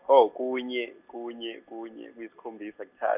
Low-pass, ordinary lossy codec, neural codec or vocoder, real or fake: 3.6 kHz; none; none; real